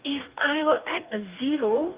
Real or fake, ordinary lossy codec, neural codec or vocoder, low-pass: fake; Opus, 32 kbps; codec, 44.1 kHz, 2.6 kbps, DAC; 3.6 kHz